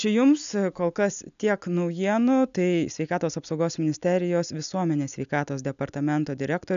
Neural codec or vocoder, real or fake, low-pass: none; real; 7.2 kHz